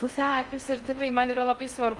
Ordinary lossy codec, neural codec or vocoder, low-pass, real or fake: Opus, 32 kbps; codec, 16 kHz in and 24 kHz out, 0.6 kbps, FocalCodec, streaming, 4096 codes; 10.8 kHz; fake